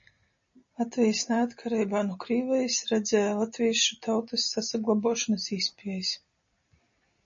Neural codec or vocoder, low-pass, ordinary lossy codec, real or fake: none; 7.2 kHz; MP3, 32 kbps; real